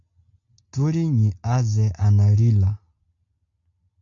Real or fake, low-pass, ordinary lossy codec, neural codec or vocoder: real; 7.2 kHz; AAC, 64 kbps; none